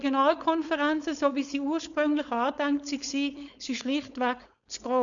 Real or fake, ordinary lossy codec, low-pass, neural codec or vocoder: fake; none; 7.2 kHz; codec, 16 kHz, 4.8 kbps, FACodec